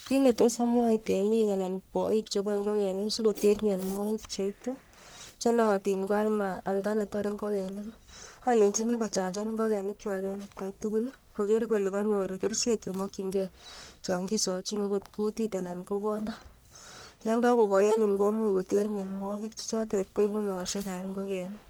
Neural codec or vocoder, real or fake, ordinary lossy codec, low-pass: codec, 44.1 kHz, 1.7 kbps, Pupu-Codec; fake; none; none